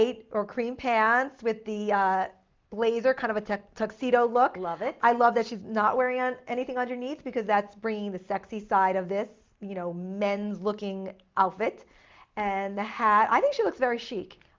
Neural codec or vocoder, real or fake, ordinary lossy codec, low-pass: none; real; Opus, 32 kbps; 7.2 kHz